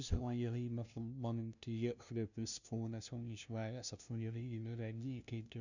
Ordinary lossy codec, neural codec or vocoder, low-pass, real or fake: none; codec, 16 kHz, 0.5 kbps, FunCodec, trained on LibriTTS, 25 frames a second; 7.2 kHz; fake